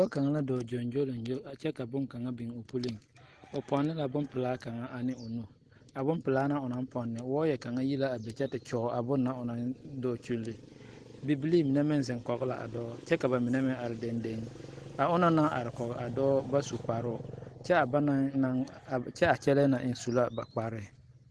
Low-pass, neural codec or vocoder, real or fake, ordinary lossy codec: 10.8 kHz; none; real; Opus, 16 kbps